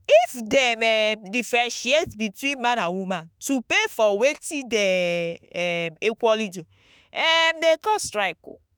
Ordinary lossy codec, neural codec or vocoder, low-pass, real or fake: none; autoencoder, 48 kHz, 32 numbers a frame, DAC-VAE, trained on Japanese speech; none; fake